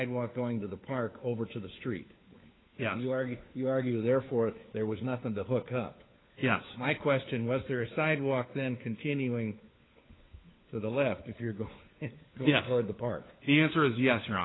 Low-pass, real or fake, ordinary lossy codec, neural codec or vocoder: 7.2 kHz; fake; AAC, 16 kbps; codec, 16 kHz, 4 kbps, FunCodec, trained on LibriTTS, 50 frames a second